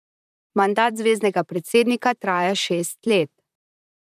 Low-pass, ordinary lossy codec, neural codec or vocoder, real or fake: 14.4 kHz; none; vocoder, 44.1 kHz, 128 mel bands, Pupu-Vocoder; fake